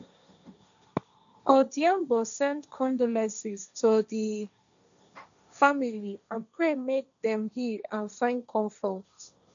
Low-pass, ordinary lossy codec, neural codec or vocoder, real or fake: 7.2 kHz; none; codec, 16 kHz, 1.1 kbps, Voila-Tokenizer; fake